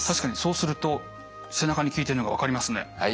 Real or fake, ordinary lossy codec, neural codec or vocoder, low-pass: real; none; none; none